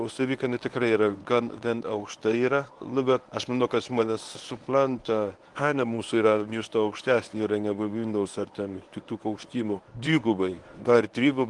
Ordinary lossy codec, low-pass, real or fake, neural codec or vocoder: Opus, 24 kbps; 10.8 kHz; fake; codec, 24 kHz, 0.9 kbps, WavTokenizer, medium speech release version 1